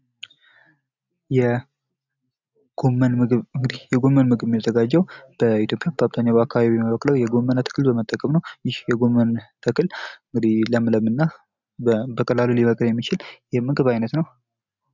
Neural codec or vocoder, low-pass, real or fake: none; 7.2 kHz; real